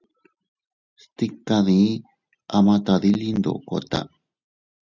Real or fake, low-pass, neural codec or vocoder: real; 7.2 kHz; none